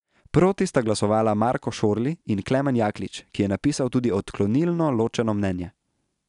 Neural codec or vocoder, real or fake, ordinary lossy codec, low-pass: none; real; none; 10.8 kHz